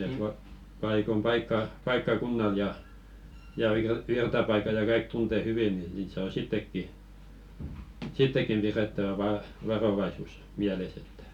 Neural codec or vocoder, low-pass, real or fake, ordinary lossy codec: none; 19.8 kHz; real; none